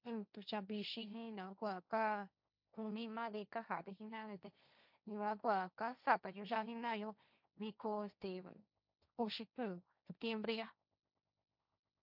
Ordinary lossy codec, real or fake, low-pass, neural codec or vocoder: none; fake; 5.4 kHz; codec, 16 kHz, 1.1 kbps, Voila-Tokenizer